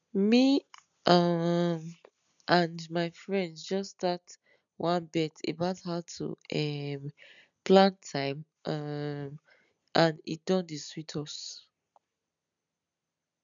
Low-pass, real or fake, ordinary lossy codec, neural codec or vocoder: 7.2 kHz; real; none; none